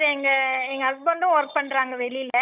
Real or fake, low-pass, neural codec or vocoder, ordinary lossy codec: fake; 3.6 kHz; codec, 16 kHz, 16 kbps, FunCodec, trained on Chinese and English, 50 frames a second; Opus, 64 kbps